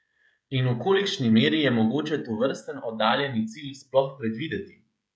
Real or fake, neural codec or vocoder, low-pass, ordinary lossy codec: fake; codec, 16 kHz, 16 kbps, FreqCodec, smaller model; none; none